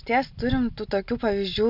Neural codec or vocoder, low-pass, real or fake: none; 5.4 kHz; real